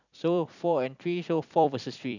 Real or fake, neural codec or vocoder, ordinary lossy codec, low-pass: fake; vocoder, 44.1 kHz, 128 mel bands every 256 samples, BigVGAN v2; none; 7.2 kHz